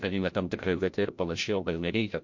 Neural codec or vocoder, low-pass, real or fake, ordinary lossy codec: codec, 16 kHz, 0.5 kbps, FreqCodec, larger model; 7.2 kHz; fake; MP3, 48 kbps